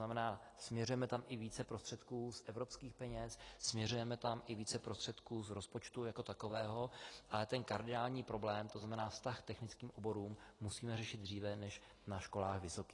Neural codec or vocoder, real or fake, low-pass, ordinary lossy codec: none; real; 10.8 kHz; AAC, 32 kbps